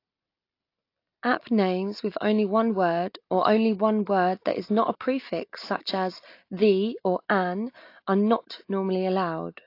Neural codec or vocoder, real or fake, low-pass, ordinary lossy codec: none; real; 5.4 kHz; AAC, 32 kbps